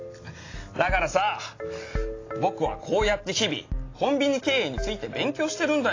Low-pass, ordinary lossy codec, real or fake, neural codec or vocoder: 7.2 kHz; AAC, 32 kbps; real; none